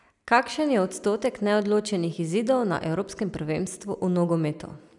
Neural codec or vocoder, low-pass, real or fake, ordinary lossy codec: none; 10.8 kHz; real; none